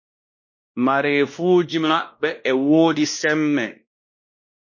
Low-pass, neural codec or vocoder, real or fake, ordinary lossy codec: 7.2 kHz; codec, 16 kHz, 1 kbps, X-Codec, WavLM features, trained on Multilingual LibriSpeech; fake; MP3, 32 kbps